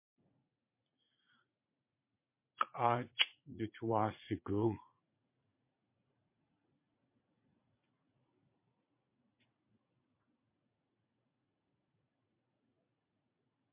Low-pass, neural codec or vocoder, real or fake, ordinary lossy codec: 3.6 kHz; vocoder, 44.1 kHz, 80 mel bands, Vocos; fake; MP3, 24 kbps